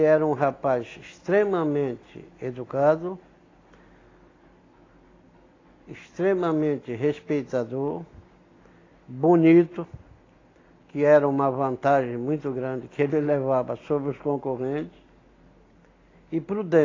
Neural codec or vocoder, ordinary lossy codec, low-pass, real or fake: none; AAC, 32 kbps; 7.2 kHz; real